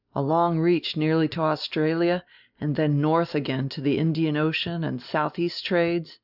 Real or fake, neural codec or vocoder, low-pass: real; none; 5.4 kHz